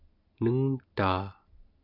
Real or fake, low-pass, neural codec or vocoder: real; 5.4 kHz; none